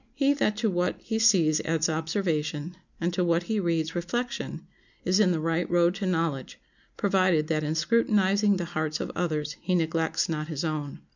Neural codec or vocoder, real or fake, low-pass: none; real; 7.2 kHz